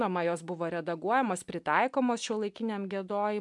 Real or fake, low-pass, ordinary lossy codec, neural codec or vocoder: real; 10.8 kHz; MP3, 96 kbps; none